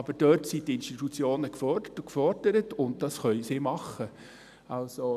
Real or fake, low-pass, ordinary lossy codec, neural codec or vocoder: real; 14.4 kHz; none; none